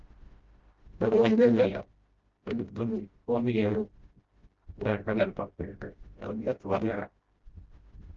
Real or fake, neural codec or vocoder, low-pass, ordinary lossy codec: fake; codec, 16 kHz, 0.5 kbps, FreqCodec, smaller model; 7.2 kHz; Opus, 16 kbps